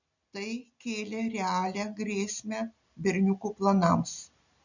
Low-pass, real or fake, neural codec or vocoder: 7.2 kHz; real; none